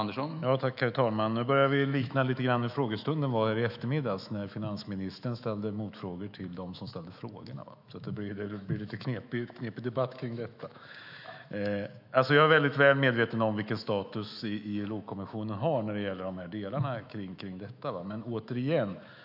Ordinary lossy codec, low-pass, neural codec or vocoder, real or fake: none; 5.4 kHz; autoencoder, 48 kHz, 128 numbers a frame, DAC-VAE, trained on Japanese speech; fake